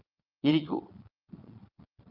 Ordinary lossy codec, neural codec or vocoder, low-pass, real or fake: Opus, 24 kbps; vocoder, 22.05 kHz, 80 mel bands, Vocos; 5.4 kHz; fake